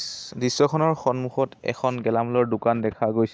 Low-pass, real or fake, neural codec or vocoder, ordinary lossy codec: none; real; none; none